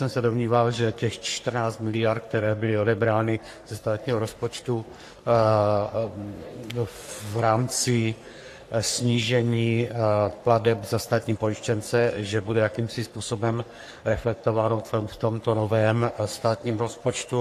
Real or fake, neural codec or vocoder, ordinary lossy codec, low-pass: fake; codec, 44.1 kHz, 3.4 kbps, Pupu-Codec; AAC, 64 kbps; 14.4 kHz